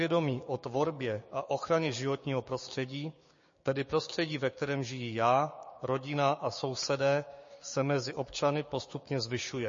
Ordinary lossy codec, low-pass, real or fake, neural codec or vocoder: MP3, 32 kbps; 7.2 kHz; fake; codec, 16 kHz, 6 kbps, DAC